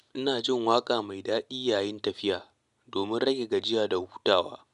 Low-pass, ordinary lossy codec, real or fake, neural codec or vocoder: 10.8 kHz; none; real; none